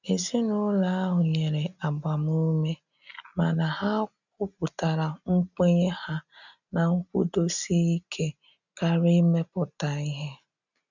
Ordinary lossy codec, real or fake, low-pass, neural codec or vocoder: none; real; 7.2 kHz; none